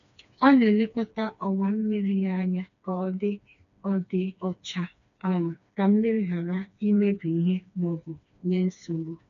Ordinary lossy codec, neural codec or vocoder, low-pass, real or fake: none; codec, 16 kHz, 2 kbps, FreqCodec, smaller model; 7.2 kHz; fake